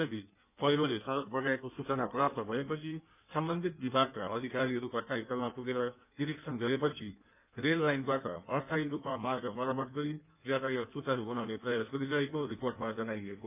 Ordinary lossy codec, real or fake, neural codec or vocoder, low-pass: none; fake; codec, 16 kHz in and 24 kHz out, 1.1 kbps, FireRedTTS-2 codec; 3.6 kHz